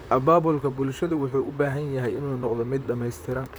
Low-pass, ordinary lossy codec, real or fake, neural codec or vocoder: none; none; fake; vocoder, 44.1 kHz, 128 mel bands, Pupu-Vocoder